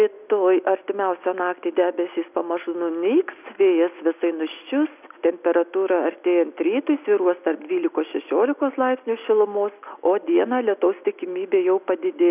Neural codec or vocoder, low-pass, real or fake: none; 3.6 kHz; real